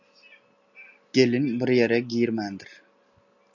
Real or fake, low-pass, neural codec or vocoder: real; 7.2 kHz; none